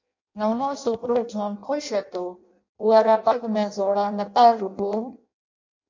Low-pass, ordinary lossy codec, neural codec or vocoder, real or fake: 7.2 kHz; MP3, 48 kbps; codec, 16 kHz in and 24 kHz out, 0.6 kbps, FireRedTTS-2 codec; fake